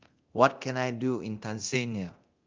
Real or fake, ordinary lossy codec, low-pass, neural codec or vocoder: fake; Opus, 32 kbps; 7.2 kHz; codec, 24 kHz, 0.9 kbps, DualCodec